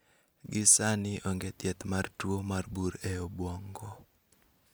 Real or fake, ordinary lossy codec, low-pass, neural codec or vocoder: real; none; none; none